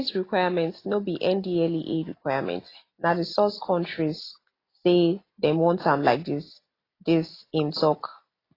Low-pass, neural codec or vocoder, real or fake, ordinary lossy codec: 5.4 kHz; none; real; AAC, 24 kbps